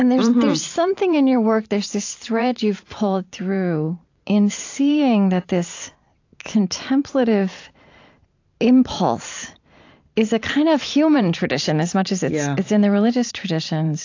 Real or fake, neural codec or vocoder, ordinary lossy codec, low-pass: fake; vocoder, 44.1 kHz, 128 mel bands every 512 samples, BigVGAN v2; AAC, 48 kbps; 7.2 kHz